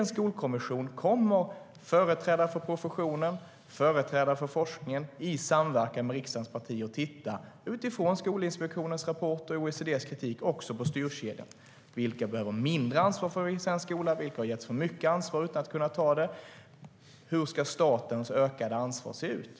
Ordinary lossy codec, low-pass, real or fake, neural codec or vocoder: none; none; real; none